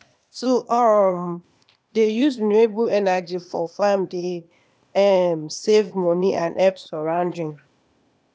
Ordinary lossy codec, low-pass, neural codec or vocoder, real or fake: none; none; codec, 16 kHz, 0.8 kbps, ZipCodec; fake